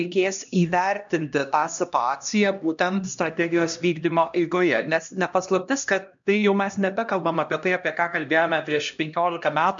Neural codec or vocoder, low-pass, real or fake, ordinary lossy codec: codec, 16 kHz, 1 kbps, X-Codec, HuBERT features, trained on LibriSpeech; 7.2 kHz; fake; MP3, 64 kbps